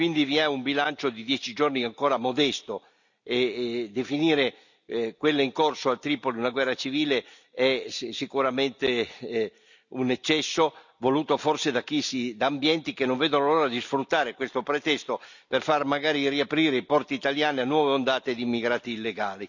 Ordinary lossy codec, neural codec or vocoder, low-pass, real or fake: none; none; 7.2 kHz; real